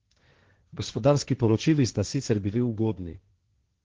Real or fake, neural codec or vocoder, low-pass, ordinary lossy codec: fake; codec, 16 kHz, 1.1 kbps, Voila-Tokenizer; 7.2 kHz; Opus, 16 kbps